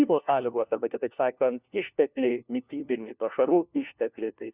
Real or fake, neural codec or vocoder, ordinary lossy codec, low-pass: fake; codec, 16 kHz, 1 kbps, FunCodec, trained on LibriTTS, 50 frames a second; Opus, 64 kbps; 3.6 kHz